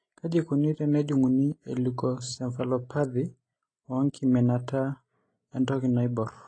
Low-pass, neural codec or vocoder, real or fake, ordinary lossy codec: 9.9 kHz; none; real; AAC, 32 kbps